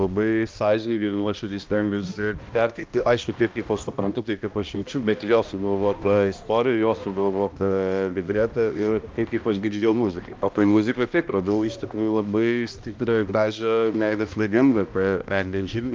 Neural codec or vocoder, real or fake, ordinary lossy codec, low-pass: codec, 16 kHz, 1 kbps, X-Codec, HuBERT features, trained on balanced general audio; fake; Opus, 24 kbps; 7.2 kHz